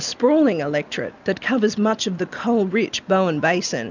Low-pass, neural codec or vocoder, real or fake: 7.2 kHz; none; real